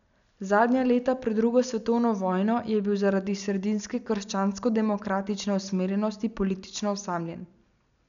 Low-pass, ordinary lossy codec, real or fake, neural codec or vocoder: 7.2 kHz; none; real; none